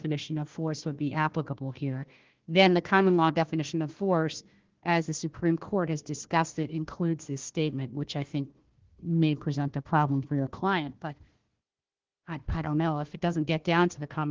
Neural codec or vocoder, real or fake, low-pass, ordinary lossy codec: codec, 16 kHz, 1 kbps, FunCodec, trained on Chinese and English, 50 frames a second; fake; 7.2 kHz; Opus, 16 kbps